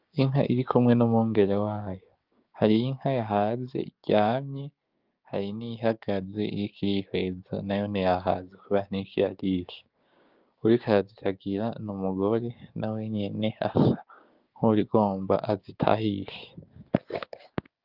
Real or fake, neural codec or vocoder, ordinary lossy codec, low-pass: fake; codec, 16 kHz, 6 kbps, DAC; Opus, 32 kbps; 5.4 kHz